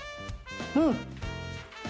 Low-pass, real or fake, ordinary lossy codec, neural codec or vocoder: none; real; none; none